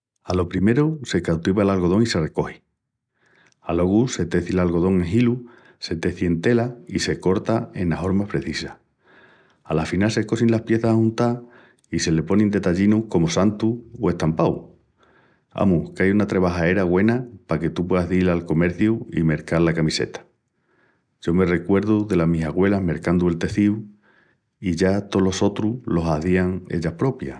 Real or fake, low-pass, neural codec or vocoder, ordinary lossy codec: real; 9.9 kHz; none; none